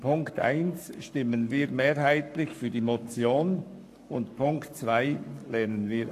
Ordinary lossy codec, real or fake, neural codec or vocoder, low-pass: AAC, 64 kbps; fake; codec, 44.1 kHz, 7.8 kbps, Pupu-Codec; 14.4 kHz